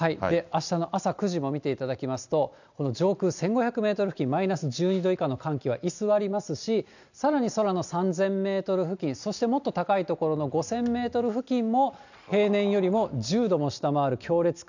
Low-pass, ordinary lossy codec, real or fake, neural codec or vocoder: 7.2 kHz; none; real; none